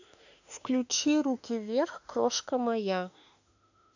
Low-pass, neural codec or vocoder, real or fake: 7.2 kHz; autoencoder, 48 kHz, 32 numbers a frame, DAC-VAE, trained on Japanese speech; fake